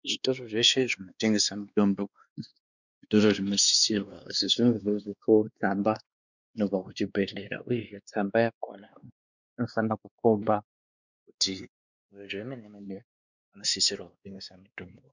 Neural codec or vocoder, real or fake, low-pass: codec, 16 kHz, 2 kbps, X-Codec, WavLM features, trained on Multilingual LibriSpeech; fake; 7.2 kHz